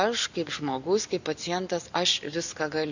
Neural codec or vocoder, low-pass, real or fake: none; 7.2 kHz; real